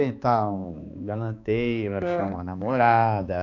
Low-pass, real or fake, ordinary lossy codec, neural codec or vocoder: 7.2 kHz; fake; none; codec, 16 kHz, 2 kbps, X-Codec, HuBERT features, trained on balanced general audio